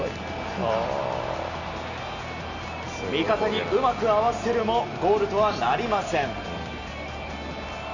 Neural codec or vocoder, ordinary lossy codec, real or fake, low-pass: none; none; real; 7.2 kHz